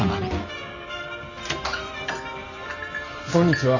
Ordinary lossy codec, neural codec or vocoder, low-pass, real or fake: none; none; 7.2 kHz; real